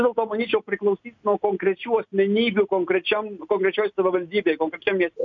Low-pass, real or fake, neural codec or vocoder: 7.2 kHz; real; none